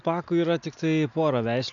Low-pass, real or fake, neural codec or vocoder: 7.2 kHz; real; none